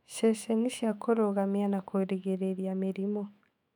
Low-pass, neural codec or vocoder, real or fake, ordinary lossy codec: 19.8 kHz; autoencoder, 48 kHz, 128 numbers a frame, DAC-VAE, trained on Japanese speech; fake; none